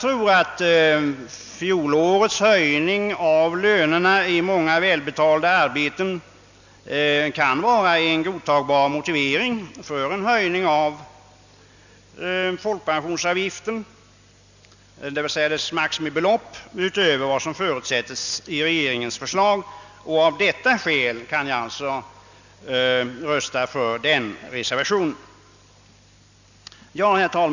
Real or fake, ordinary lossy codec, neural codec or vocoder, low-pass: real; none; none; 7.2 kHz